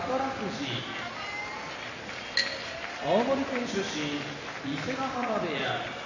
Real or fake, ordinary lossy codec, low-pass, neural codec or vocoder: real; none; 7.2 kHz; none